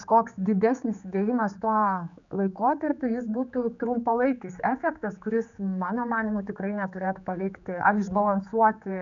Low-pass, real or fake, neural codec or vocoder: 7.2 kHz; fake; codec, 16 kHz, 4 kbps, X-Codec, HuBERT features, trained on general audio